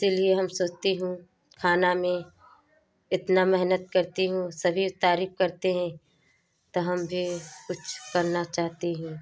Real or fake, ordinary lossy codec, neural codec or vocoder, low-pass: real; none; none; none